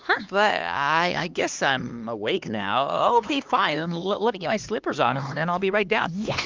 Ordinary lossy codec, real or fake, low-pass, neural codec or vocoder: Opus, 32 kbps; fake; 7.2 kHz; codec, 16 kHz, 2 kbps, X-Codec, HuBERT features, trained on LibriSpeech